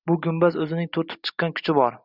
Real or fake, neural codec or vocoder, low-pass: real; none; 5.4 kHz